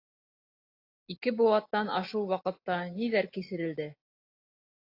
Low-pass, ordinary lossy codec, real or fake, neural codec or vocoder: 5.4 kHz; AAC, 32 kbps; real; none